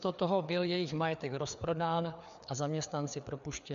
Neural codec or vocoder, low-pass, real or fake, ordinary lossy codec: codec, 16 kHz, 4 kbps, FreqCodec, larger model; 7.2 kHz; fake; MP3, 64 kbps